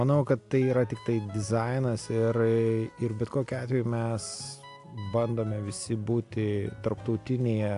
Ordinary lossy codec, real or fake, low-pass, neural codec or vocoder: AAC, 64 kbps; real; 10.8 kHz; none